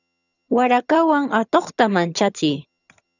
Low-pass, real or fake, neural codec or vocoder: 7.2 kHz; fake; vocoder, 22.05 kHz, 80 mel bands, HiFi-GAN